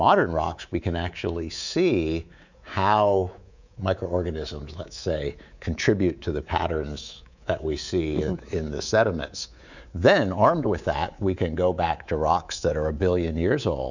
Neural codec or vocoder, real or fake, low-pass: codec, 24 kHz, 3.1 kbps, DualCodec; fake; 7.2 kHz